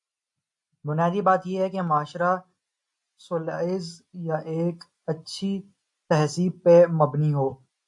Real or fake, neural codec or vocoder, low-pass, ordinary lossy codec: real; none; 10.8 kHz; AAC, 64 kbps